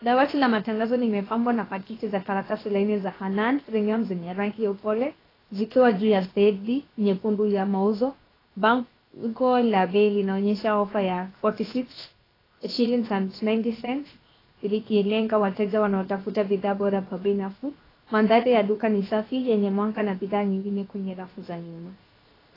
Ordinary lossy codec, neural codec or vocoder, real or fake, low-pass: AAC, 24 kbps; codec, 16 kHz, 0.7 kbps, FocalCodec; fake; 5.4 kHz